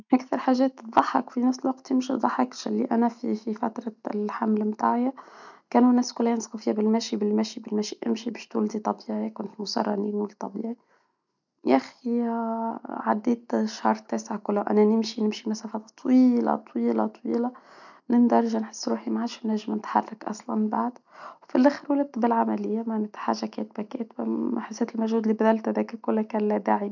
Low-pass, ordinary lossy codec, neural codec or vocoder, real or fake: 7.2 kHz; none; none; real